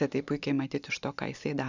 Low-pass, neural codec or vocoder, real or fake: 7.2 kHz; none; real